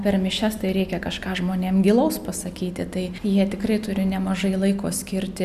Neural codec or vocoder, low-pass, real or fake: none; 14.4 kHz; real